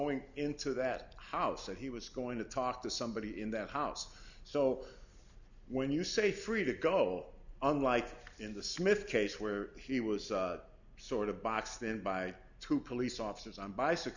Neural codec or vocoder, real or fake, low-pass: none; real; 7.2 kHz